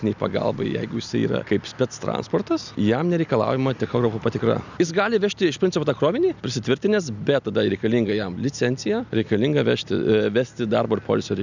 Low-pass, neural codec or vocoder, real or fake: 7.2 kHz; none; real